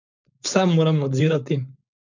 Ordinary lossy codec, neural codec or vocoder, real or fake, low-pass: none; codec, 16 kHz, 4.8 kbps, FACodec; fake; 7.2 kHz